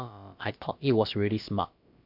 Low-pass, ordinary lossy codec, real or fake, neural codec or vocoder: 5.4 kHz; MP3, 48 kbps; fake; codec, 16 kHz, about 1 kbps, DyCAST, with the encoder's durations